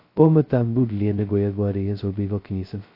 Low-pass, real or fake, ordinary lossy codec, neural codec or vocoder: 5.4 kHz; fake; AAC, 32 kbps; codec, 16 kHz, 0.2 kbps, FocalCodec